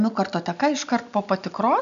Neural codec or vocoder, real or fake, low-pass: none; real; 7.2 kHz